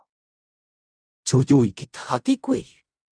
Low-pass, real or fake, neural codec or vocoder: 9.9 kHz; fake; codec, 16 kHz in and 24 kHz out, 0.4 kbps, LongCat-Audio-Codec, fine tuned four codebook decoder